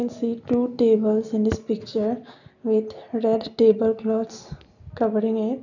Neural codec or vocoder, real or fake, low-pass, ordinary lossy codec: none; real; 7.2 kHz; none